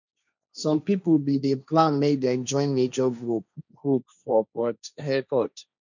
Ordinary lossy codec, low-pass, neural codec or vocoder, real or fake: none; 7.2 kHz; codec, 16 kHz, 1.1 kbps, Voila-Tokenizer; fake